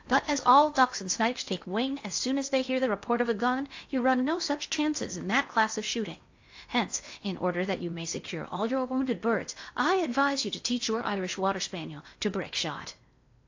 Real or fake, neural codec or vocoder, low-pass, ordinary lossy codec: fake; codec, 16 kHz in and 24 kHz out, 0.8 kbps, FocalCodec, streaming, 65536 codes; 7.2 kHz; AAC, 48 kbps